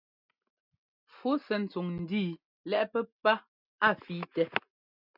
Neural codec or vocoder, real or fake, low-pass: none; real; 5.4 kHz